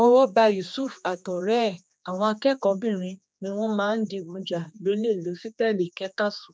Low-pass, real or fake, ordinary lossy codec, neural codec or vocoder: none; fake; none; codec, 16 kHz, 2 kbps, X-Codec, HuBERT features, trained on general audio